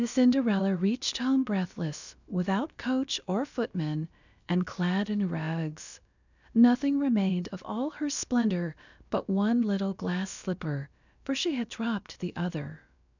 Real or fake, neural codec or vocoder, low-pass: fake; codec, 16 kHz, about 1 kbps, DyCAST, with the encoder's durations; 7.2 kHz